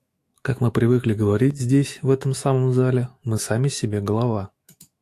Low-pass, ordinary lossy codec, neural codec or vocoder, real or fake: 14.4 kHz; AAC, 64 kbps; autoencoder, 48 kHz, 128 numbers a frame, DAC-VAE, trained on Japanese speech; fake